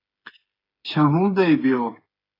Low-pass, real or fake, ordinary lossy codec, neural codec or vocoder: 5.4 kHz; fake; AAC, 24 kbps; codec, 16 kHz, 8 kbps, FreqCodec, smaller model